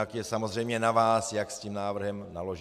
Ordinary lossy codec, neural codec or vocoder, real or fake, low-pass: MP3, 96 kbps; none; real; 14.4 kHz